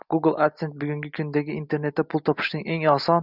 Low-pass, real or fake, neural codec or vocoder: 5.4 kHz; real; none